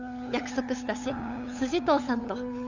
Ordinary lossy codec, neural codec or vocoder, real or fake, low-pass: none; codec, 16 kHz, 8 kbps, FunCodec, trained on LibriTTS, 25 frames a second; fake; 7.2 kHz